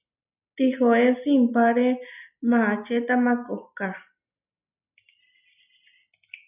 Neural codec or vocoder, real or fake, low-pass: none; real; 3.6 kHz